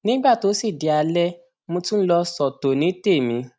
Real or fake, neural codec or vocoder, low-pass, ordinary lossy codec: real; none; none; none